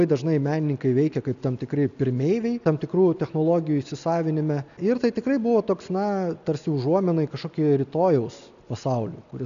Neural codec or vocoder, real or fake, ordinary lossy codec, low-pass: none; real; MP3, 96 kbps; 7.2 kHz